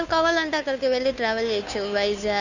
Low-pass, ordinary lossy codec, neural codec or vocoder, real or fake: 7.2 kHz; none; codec, 16 kHz, 2 kbps, FunCodec, trained on Chinese and English, 25 frames a second; fake